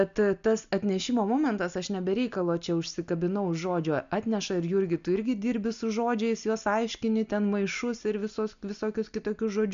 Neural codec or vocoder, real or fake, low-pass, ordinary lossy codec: none; real; 7.2 kHz; AAC, 96 kbps